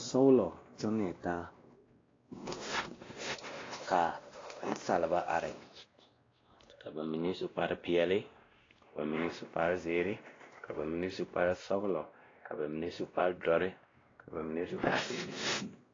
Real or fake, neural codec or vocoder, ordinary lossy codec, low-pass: fake; codec, 16 kHz, 1 kbps, X-Codec, WavLM features, trained on Multilingual LibriSpeech; AAC, 32 kbps; 7.2 kHz